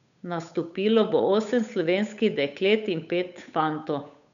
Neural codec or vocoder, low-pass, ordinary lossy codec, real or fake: codec, 16 kHz, 8 kbps, FunCodec, trained on Chinese and English, 25 frames a second; 7.2 kHz; none; fake